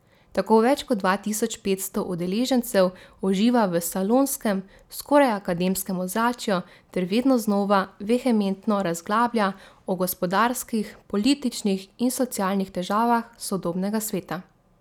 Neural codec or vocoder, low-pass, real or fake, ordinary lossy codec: none; 19.8 kHz; real; none